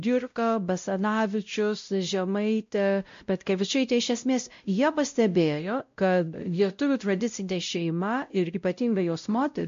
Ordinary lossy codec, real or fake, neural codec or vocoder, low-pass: MP3, 48 kbps; fake; codec, 16 kHz, 0.5 kbps, X-Codec, WavLM features, trained on Multilingual LibriSpeech; 7.2 kHz